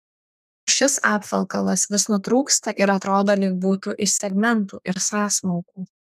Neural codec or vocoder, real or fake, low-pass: codec, 32 kHz, 1.9 kbps, SNAC; fake; 14.4 kHz